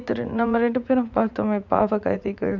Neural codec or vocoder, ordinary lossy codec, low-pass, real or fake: vocoder, 44.1 kHz, 80 mel bands, Vocos; none; 7.2 kHz; fake